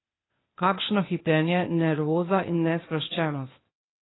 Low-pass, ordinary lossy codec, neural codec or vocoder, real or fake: 7.2 kHz; AAC, 16 kbps; codec, 16 kHz, 0.8 kbps, ZipCodec; fake